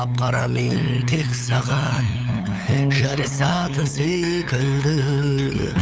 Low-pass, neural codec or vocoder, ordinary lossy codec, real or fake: none; codec, 16 kHz, 8 kbps, FunCodec, trained on LibriTTS, 25 frames a second; none; fake